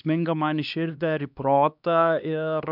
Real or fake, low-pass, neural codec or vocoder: fake; 5.4 kHz; codec, 16 kHz, 2 kbps, X-Codec, HuBERT features, trained on LibriSpeech